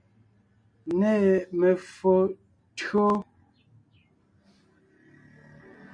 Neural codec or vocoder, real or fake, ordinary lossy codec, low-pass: none; real; MP3, 48 kbps; 9.9 kHz